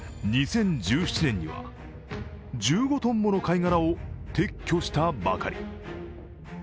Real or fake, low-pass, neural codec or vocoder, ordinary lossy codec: real; none; none; none